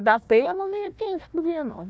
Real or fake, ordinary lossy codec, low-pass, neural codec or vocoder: fake; none; none; codec, 16 kHz, 1 kbps, FunCodec, trained on Chinese and English, 50 frames a second